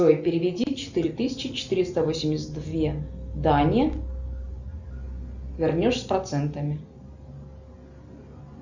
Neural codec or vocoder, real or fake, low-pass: none; real; 7.2 kHz